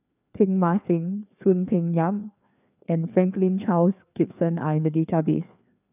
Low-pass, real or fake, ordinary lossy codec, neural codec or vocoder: 3.6 kHz; fake; none; codec, 16 kHz, 2 kbps, FreqCodec, larger model